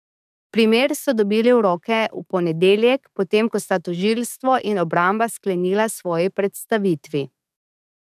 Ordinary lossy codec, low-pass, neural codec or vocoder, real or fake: none; 14.4 kHz; codec, 44.1 kHz, 7.8 kbps, DAC; fake